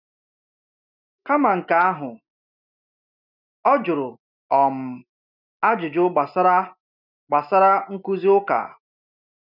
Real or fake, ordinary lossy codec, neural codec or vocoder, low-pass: real; none; none; 5.4 kHz